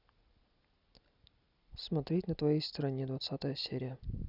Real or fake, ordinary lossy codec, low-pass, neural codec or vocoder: real; Opus, 64 kbps; 5.4 kHz; none